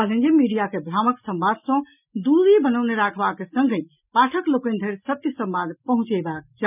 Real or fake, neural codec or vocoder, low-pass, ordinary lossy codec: real; none; 3.6 kHz; none